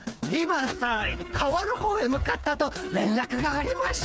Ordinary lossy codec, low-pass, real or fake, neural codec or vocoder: none; none; fake; codec, 16 kHz, 4 kbps, FreqCodec, smaller model